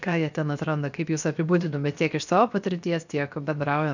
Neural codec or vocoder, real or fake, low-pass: codec, 16 kHz, 0.7 kbps, FocalCodec; fake; 7.2 kHz